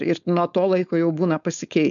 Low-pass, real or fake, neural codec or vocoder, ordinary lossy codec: 7.2 kHz; fake; codec, 16 kHz, 4.8 kbps, FACodec; MP3, 96 kbps